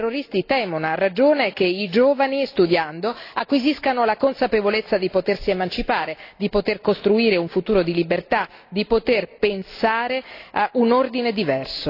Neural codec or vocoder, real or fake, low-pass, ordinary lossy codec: none; real; 5.4 kHz; AAC, 32 kbps